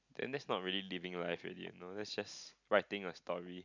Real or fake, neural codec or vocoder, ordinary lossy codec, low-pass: real; none; none; 7.2 kHz